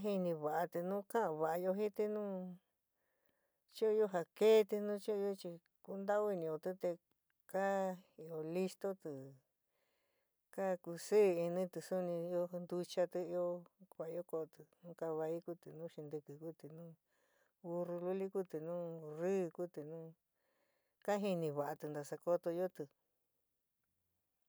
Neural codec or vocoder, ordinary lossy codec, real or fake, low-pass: none; none; real; none